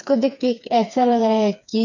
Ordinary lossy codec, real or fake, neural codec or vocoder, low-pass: none; fake; codec, 16 kHz, 4 kbps, FreqCodec, smaller model; 7.2 kHz